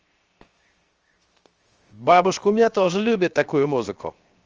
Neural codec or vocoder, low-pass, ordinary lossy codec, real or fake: codec, 16 kHz, 0.7 kbps, FocalCodec; 7.2 kHz; Opus, 24 kbps; fake